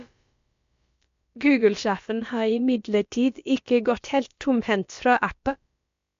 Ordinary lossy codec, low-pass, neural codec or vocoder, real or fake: MP3, 48 kbps; 7.2 kHz; codec, 16 kHz, about 1 kbps, DyCAST, with the encoder's durations; fake